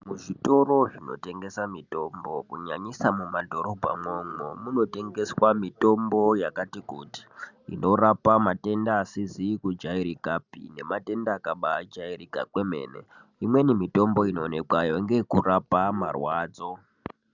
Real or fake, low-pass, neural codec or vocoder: real; 7.2 kHz; none